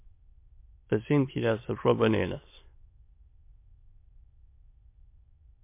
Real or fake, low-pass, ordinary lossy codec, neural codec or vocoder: fake; 3.6 kHz; MP3, 24 kbps; autoencoder, 22.05 kHz, a latent of 192 numbers a frame, VITS, trained on many speakers